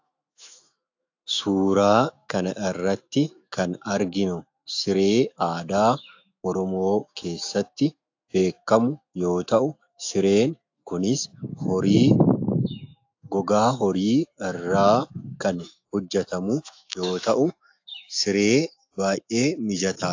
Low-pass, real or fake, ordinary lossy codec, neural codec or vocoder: 7.2 kHz; fake; AAC, 48 kbps; autoencoder, 48 kHz, 128 numbers a frame, DAC-VAE, trained on Japanese speech